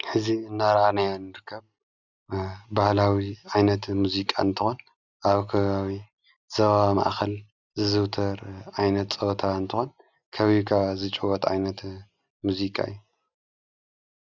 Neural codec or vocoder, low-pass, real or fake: none; 7.2 kHz; real